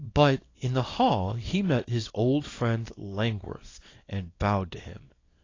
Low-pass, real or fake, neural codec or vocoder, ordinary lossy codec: 7.2 kHz; fake; codec, 24 kHz, 0.9 kbps, WavTokenizer, small release; AAC, 32 kbps